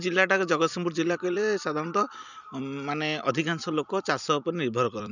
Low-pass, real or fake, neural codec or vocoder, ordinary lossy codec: 7.2 kHz; fake; vocoder, 44.1 kHz, 128 mel bands every 256 samples, BigVGAN v2; none